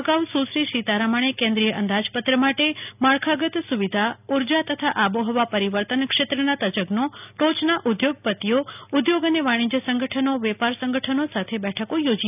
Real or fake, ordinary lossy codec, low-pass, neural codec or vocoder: real; none; 3.6 kHz; none